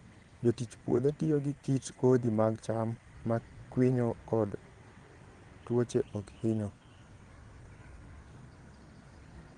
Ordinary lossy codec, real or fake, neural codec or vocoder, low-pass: Opus, 24 kbps; fake; vocoder, 22.05 kHz, 80 mel bands, WaveNeXt; 9.9 kHz